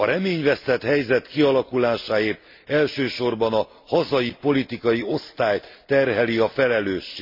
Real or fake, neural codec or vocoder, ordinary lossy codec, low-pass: real; none; MP3, 32 kbps; 5.4 kHz